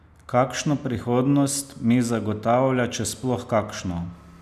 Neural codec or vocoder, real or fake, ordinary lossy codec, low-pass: none; real; none; 14.4 kHz